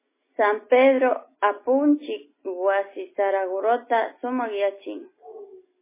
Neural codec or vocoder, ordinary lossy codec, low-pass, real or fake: none; MP3, 16 kbps; 3.6 kHz; real